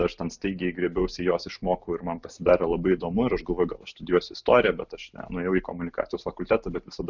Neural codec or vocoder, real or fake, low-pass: none; real; 7.2 kHz